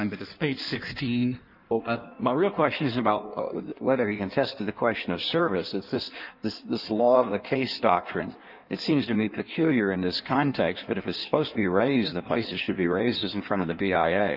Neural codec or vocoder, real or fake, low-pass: codec, 16 kHz in and 24 kHz out, 1.1 kbps, FireRedTTS-2 codec; fake; 5.4 kHz